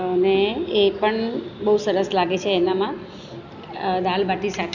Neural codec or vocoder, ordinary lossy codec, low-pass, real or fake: none; none; 7.2 kHz; real